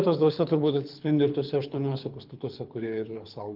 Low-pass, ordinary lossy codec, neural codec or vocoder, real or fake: 5.4 kHz; Opus, 32 kbps; codec, 24 kHz, 6 kbps, HILCodec; fake